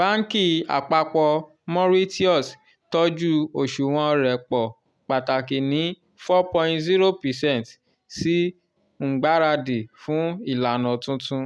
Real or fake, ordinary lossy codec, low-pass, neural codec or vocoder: real; none; none; none